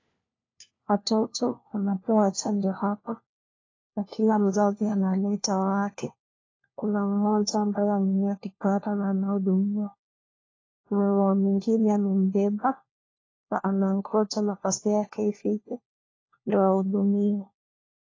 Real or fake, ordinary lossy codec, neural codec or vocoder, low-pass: fake; AAC, 32 kbps; codec, 16 kHz, 1 kbps, FunCodec, trained on LibriTTS, 50 frames a second; 7.2 kHz